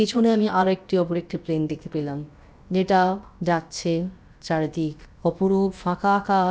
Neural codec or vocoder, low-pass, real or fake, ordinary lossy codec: codec, 16 kHz, about 1 kbps, DyCAST, with the encoder's durations; none; fake; none